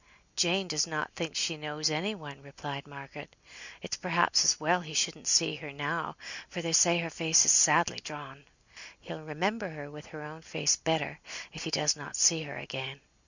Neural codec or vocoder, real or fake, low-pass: none; real; 7.2 kHz